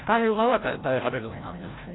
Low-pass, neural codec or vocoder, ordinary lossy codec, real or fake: 7.2 kHz; codec, 16 kHz, 0.5 kbps, FreqCodec, larger model; AAC, 16 kbps; fake